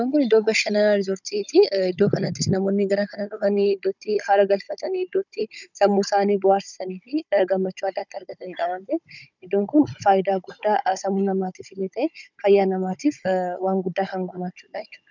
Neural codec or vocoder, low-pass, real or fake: codec, 16 kHz, 16 kbps, FunCodec, trained on Chinese and English, 50 frames a second; 7.2 kHz; fake